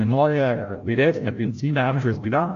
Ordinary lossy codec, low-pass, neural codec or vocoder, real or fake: AAC, 48 kbps; 7.2 kHz; codec, 16 kHz, 0.5 kbps, FreqCodec, larger model; fake